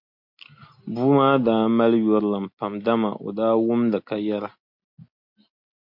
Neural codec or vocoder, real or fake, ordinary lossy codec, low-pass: none; real; MP3, 48 kbps; 5.4 kHz